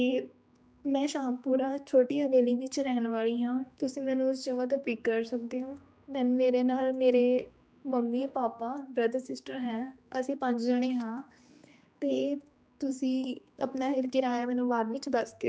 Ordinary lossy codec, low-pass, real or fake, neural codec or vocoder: none; none; fake; codec, 16 kHz, 2 kbps, X-Codec, HuBERT features, trained on general audio